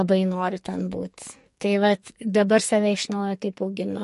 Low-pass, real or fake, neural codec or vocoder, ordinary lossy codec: 14.4 kHz; fake; codec, 44.1 kHz, 2.6 kbps, SNAC; MP3, 48 kbps